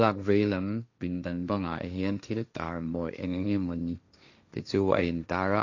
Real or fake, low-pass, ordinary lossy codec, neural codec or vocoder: fake; 7.2 kHz; AAC, 48 kbps; codec, 16 kHz, 1.1 kbps, Voila-Tokenizer